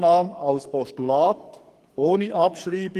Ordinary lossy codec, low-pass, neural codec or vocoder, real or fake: Opus, 24 kbps; 14.4 kHz; codec, 44.1 kHz, 2.6 kbps, SNAC; fake